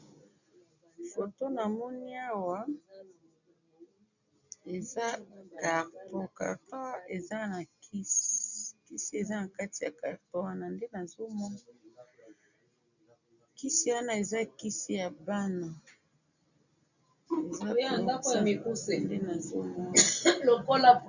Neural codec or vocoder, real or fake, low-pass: none; real; 7.2 kHz